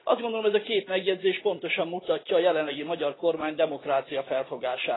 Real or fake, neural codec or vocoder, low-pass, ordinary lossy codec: real; none; 7.2 kHz; AAC, 16 kbps